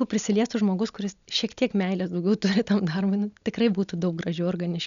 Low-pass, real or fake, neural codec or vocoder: 7.2 kHz; real; none